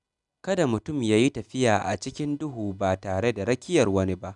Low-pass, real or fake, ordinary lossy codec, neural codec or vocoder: 10.8 kHz; real; none; none